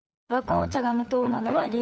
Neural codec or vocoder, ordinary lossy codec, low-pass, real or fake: codec, 16 kHz, 8 kbps, FunCodec, trained on LibriTTS, 25 frames a second; none; none; fake